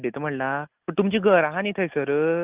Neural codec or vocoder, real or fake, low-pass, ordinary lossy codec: none; real; 3.6 kHz; Opus, 24 kbps